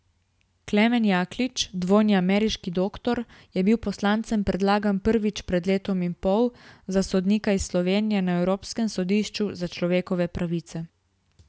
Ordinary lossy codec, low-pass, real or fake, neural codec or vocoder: none; none; real; none